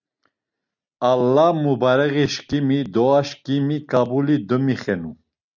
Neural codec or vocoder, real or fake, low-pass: none; real; 7.2 kHz